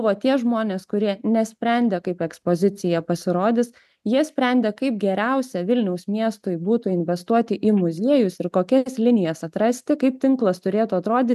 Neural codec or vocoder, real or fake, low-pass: none; real; 14.4 kHz